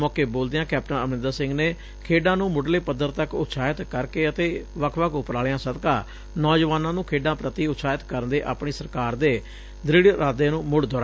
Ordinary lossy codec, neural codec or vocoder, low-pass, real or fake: none; none; none; real